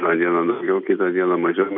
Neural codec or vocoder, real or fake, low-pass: none; real; 5.4 kHz